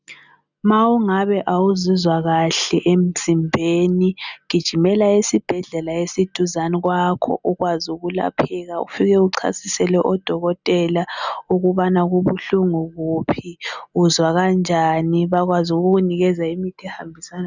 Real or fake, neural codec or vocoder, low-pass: real; none; 7.2 kHz